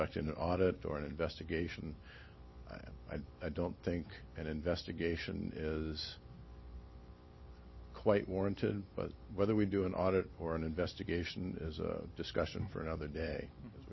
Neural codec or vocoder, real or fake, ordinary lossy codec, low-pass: none; real; MP3, 24 kbps; 7.2 kHz